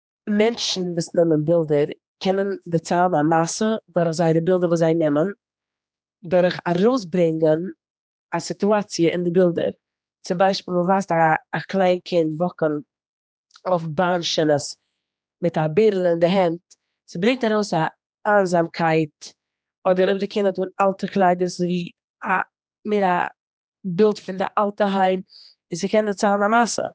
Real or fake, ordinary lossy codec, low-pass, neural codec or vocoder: fake; none; none; codec, 16 kHz, 2 kbps, X-Codec, HuBERT features, trained on general audio